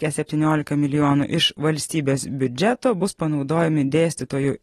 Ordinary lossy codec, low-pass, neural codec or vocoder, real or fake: AAC, 32 kbps; 14.4 kHz; none; real